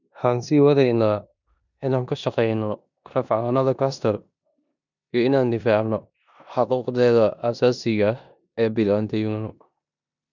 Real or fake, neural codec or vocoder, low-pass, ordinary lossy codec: fake; codec, 16 kHz in and 24 kHz out, 0.9 kbps, LongCat-Audio-Codec, four codebook decoder; 7.2 kHz; none